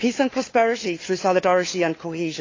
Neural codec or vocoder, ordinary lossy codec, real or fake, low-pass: codec, 16 kHz, 4 kbps, FunCodec, trained on LibriTTS, 50 frames a second; AAC, 32 kbps; fake; 7.2 kHz